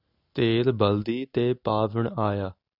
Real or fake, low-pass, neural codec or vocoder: real; 5.4 kHz; none